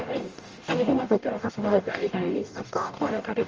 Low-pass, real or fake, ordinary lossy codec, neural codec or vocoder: 7.2 kHz; fake; Opus, 24 kbps; codec, 44.1 kHz, 0.9 kbps, DAC